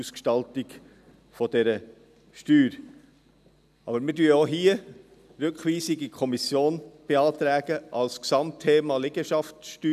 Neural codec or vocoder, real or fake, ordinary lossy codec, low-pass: none; real; none; 14.4 kHz